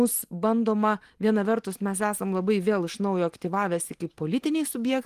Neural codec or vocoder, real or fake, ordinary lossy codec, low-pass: none; real; Opus, 24 kbps; 14.4 kHz